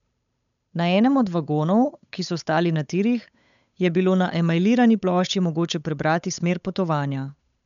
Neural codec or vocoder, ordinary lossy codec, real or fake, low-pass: codec, 16 kHz, 8 kbps, FunCodec, trained on Chinese and English, 25 frames a second; none; fake; 7.2 kHz